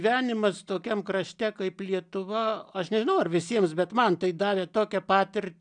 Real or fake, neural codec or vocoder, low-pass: real; none; 9.9 kHz